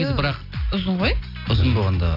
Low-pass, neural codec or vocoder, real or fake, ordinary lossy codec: 5.4 kHz; none; real; AAC, 32 kbps